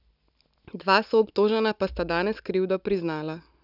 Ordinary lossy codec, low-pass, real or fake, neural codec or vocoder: none; 5.4 kHz; real; none